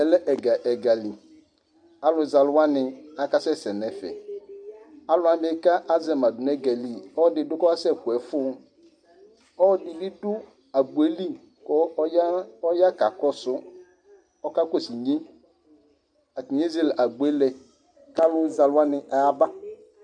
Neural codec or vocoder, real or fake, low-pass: none; real; 9.9 kHz